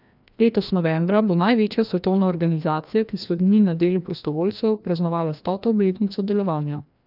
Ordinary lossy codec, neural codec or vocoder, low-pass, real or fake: none; codec, 16 kHz, 1 kbps, FreqCodec, larger model; 5.4 kHz; fake